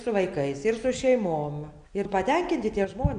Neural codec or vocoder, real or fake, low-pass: none; real; 9.9 kHz